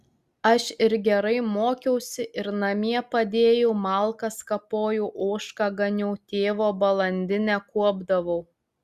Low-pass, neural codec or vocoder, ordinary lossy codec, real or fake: 14.4 kHz; none; Opus, 64 kbps; real